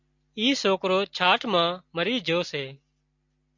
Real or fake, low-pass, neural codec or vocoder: real; 7.2 kHz; none